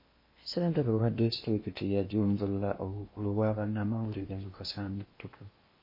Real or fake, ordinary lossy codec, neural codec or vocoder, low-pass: fake; MP3, 24 kbps; codec, 16 kHz in and 24 kHz out, 0.8 kbps, FocalCodec, streaming, 65536 codes; 5.4 kHz